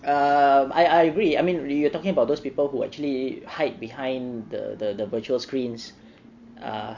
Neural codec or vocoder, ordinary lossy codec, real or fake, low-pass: none; MP3, 48 kbps; real; 7.2 kHz